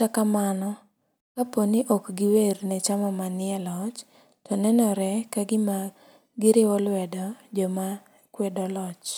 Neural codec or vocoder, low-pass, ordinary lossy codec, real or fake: none; none; none; real